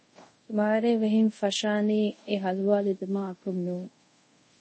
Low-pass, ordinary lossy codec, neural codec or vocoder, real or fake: 9.9 kHz; MP3, 32 kbps; codec, 24 kHz, 0.5 kbps, DualCodec; fake